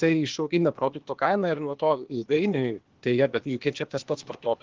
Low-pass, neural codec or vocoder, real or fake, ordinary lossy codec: 7.2 kHz; codec, 16 kHz, 0.8 kbps, ZipCodec; fake; Opus, 16 kbps